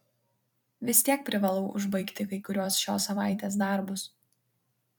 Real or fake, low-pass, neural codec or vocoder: fake; 19.8 kHz; vocoder, 44.1 kHz, 128 mel bands every 256 samples, BigVGAN v2